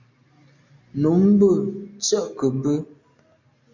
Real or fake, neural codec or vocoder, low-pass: real; none; 7.2 kHz